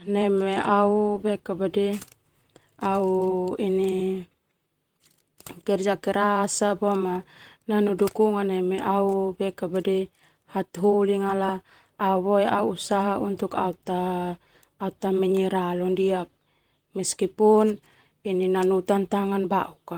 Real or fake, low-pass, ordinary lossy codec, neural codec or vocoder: fake; 19.8 kHz; Opus, 24 kbps; vocoder, 44.1 kHz, 128 mel bands, Pupu-Vocoder